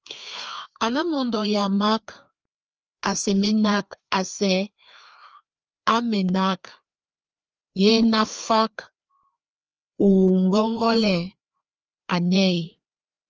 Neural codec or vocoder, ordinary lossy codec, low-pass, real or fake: codec, 16 kHz, 2 kbps, FreqCodec, larger model; Opus, 24 kbps; 7.2 kHz; fake